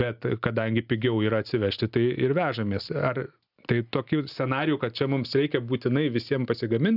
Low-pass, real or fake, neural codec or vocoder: 5.4 kHz; real; none